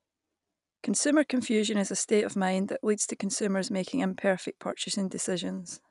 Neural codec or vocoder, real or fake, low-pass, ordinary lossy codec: none; real; 10.8 kHz; none